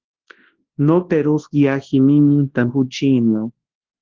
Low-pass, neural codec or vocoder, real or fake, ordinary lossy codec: 7.2 kHz; codec, 24 kHz, 0.9 kbps, WavTokenizer, large speech release; fake; Opus, 16 kbps